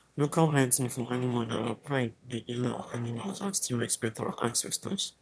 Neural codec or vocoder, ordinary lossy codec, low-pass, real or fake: autoencoder, 22.05 kHz, a latent of 192 numbers a frame, VITS, trained on one speaker; none; none; fake